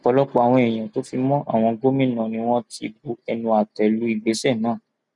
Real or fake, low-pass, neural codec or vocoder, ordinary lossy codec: real; 10.8 kHz; none; none